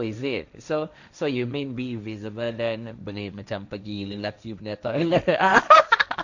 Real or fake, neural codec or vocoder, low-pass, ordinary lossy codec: fake; codec, 16 kHz, 1.1 kbps, Voila-Tokenizer; 7.2 kHz; none